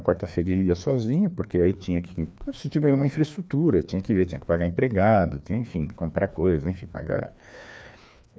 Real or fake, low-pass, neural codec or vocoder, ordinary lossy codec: fake; none; codec, 16 kHz, 2 kbps, FreqCodec, larger model; none